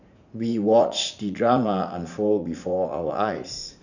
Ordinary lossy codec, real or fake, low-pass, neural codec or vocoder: none; fake; 7.2 kHz; vocoder, 44.1 kHz, 80 mel bands, Vocos